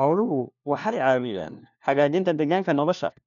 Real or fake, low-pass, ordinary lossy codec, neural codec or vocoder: fake; 7.2 kHz; none; codec, 16 kHz, 1 kbps, FunCodec, trained on LibriTTS, 50 frames a second